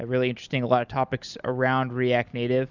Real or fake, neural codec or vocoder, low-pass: real; none; 7.2 kHz